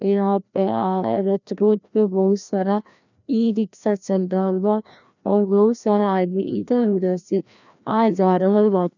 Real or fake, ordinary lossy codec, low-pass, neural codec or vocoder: fake; none; 7.2 kHz; codec, 16 kHz, 1 kbps, FreqCodec, larger model